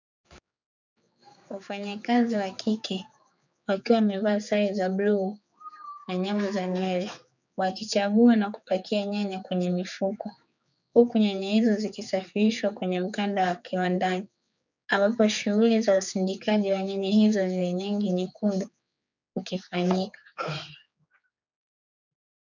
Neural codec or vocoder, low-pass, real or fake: codec, 16 kHz, 4 kbps, X-Codec, HuBERT features, trained on general audio; 7.2 kHz; fake